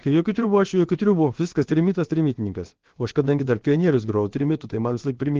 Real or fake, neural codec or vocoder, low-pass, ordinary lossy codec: fake; codec, 16 kHz, about 1 kbps, DyCAST, with the encoder's durations; 7.2 kHz; Opus, 16 kbps